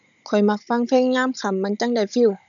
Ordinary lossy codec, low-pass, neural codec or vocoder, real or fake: none; 7.2 kHz; codec, 16 kHz, 16 kbps, FunCodec, trained on Chinese and English, 50 frames a second; fake